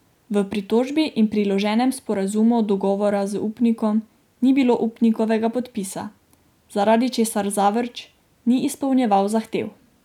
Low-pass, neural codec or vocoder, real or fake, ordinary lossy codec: 19.8 kHz; none; real; none